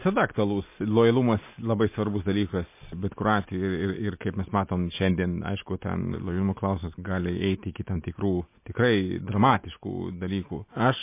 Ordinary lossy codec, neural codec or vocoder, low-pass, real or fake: MP3, 24 kbps; none; 3.6 kHz; real